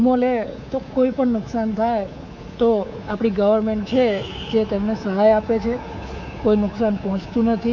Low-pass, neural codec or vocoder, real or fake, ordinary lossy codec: 7.2 kHz; codec, 24 kHz, 3.1 kbps, DualCodec; fake; none